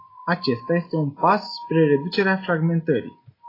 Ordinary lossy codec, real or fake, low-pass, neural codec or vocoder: AAC, 24 kbps; real; 5.4 kHz; none